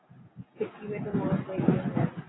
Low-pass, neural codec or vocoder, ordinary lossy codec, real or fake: 7.2 kHz; none; AAC, 16 kbps; real